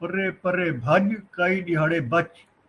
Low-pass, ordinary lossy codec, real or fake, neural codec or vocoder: 10.8 kHz; Opus, 24 kbps; real; none